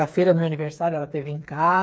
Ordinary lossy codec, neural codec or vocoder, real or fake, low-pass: none; codec, 16 kHz, 4 kbps, FreqCodec, smaller model; fake; none